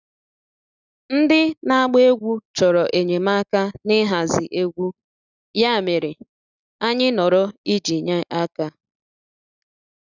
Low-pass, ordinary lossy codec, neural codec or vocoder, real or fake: 7.2 kHz; none; none; real